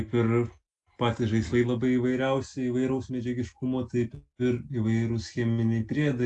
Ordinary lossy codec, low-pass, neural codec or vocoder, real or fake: AAC, 64 kbps; 10.8 kHz; none; real